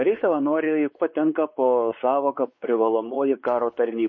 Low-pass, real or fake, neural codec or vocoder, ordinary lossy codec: 7.2 kHz; fake; codec, 16 kHz, 4 kbps, X-Codec, WavLM features, trained on Multilingual LibriSpeech; MP3, 32 kbps